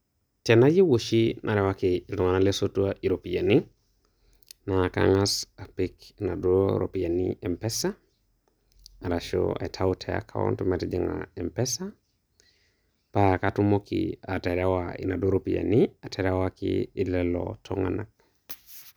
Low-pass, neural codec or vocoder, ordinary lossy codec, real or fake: none; none; none; real